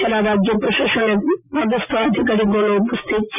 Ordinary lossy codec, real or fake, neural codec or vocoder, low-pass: none; real; none; 3.6 kHz